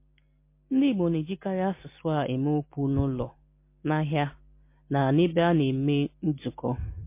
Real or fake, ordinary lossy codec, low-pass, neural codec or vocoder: real; MP3, 24 kbps; 3.6 kHz; none